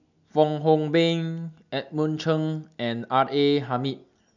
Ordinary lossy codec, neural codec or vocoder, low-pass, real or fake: none; none; 7.2 kHz; real